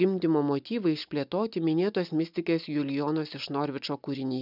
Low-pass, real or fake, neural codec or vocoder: 5.4 kHz; real; none